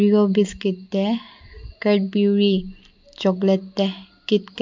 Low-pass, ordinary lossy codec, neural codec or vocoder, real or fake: 7.2 kHz; MP3, 64 kbps; none; real